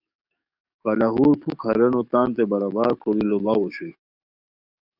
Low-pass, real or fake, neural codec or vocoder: 5.4 kHz; fake; codec, 44.1 kHz, 7.8 kbps, DAC